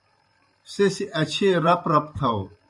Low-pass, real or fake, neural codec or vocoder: 10.8 kHz; fake; vocoder, 44.1 kHz, 128 mel bands every 512 samples, BigVGAN v2